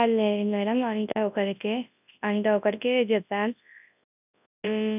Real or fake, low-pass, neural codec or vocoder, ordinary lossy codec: fake; 3.6 kHz; codec, 24 kHz, 0.9 kbps, WavTokenizer, large speech release; none